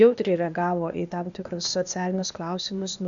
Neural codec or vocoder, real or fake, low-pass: codec, 16 kHz, 0.8 kbps, ZipCodec; fake; 7.2 kHz